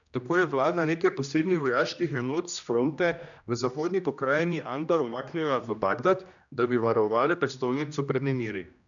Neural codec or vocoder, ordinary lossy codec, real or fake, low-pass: codec, 16 kHz, 1 kbps, X-Codec, HuBERT features, trained on general audio; none; fake; 7.2 kHz